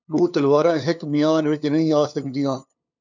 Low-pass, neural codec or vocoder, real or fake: 7.2 kHz; codec, 16 kHz, 2 kbps, FunCodec, trained on LibriTTS, 25 frames a second; fake